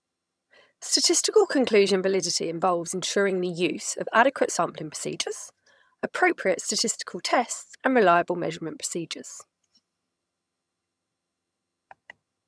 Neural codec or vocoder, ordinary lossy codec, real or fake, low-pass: vocoder, 22.05 kHz, 80 mel bands, HiFi-GAN; none; fake; none